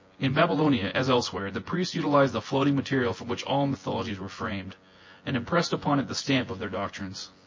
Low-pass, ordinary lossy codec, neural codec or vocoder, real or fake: 7.2 kHz; MP3, 32 kbps; vocoder, 24 kHz, 100 mel bands, Vocos; fake